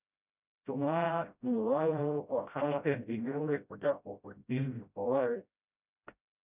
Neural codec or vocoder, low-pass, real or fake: codec, 16 kHz, 0.5 kbps, FreqCodec, smaller model; 3.6 kHz; fake